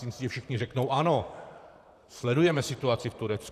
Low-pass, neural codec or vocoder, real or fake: 14.4 kHz; vocoder, 44.1 kHz, 128 mel bands, Pupu-Vocoder; fake